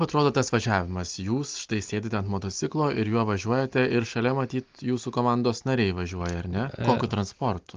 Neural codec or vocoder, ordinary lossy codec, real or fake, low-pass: none; Opus, 32 kbps; real; 7.2 kHz